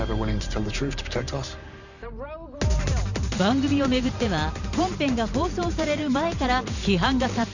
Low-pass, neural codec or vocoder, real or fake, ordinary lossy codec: 7.2 kHz; vocoder, 44.1 kHz, 128 mel bands every 512 samples, BigVGAN v2; fake; none